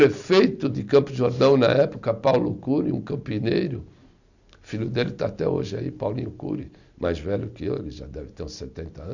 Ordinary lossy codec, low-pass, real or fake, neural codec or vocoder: none; 7.2 kHz; real; none